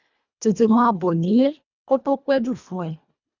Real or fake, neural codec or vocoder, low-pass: fake; codec, 24 kHz, 1.5 kbps, HILCodec; 7.2 kHz